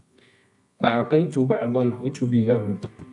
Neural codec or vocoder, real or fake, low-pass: codec, 24 kHz, 0.9 kbps, WavTokenizer, medium music audio release; fake; 10.8 kHz